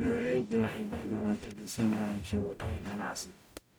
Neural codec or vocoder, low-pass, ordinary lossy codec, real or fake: codec, 44.1 kHz, 0.9 kbps, DAC; none; none; fake